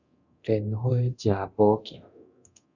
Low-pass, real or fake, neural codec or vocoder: 7.2 kHz; fake; codec, 24 kHz, 0.9 kbps, DualCodec